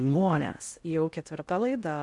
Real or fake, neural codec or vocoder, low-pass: fake; codec, 16 kHz in and 24 kHz out, 0.6 kbps, FocalCodec, streaming, 4096 codes; 10.8 kHz